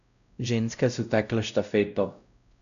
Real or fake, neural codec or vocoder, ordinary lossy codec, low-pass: fake; codec, 16 kHz, 0.5 kbps, X-Codec, WavLM features, trained on Multilingual LibriSpeech; none; 7.2 kHz